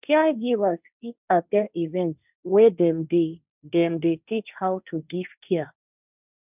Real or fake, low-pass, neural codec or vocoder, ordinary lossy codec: fake; 3.6 kHz; codec, 16 kHz, 1.1 kbps, Voila-Tokenizer; none